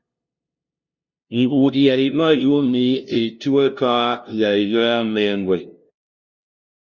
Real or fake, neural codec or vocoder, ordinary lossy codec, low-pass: fake; codec, 16 kHz, 0.5 kbps, FunCodec, trained on LibriTTS, 25 frames a second; Opus, 64 kbps; 7.2 kHz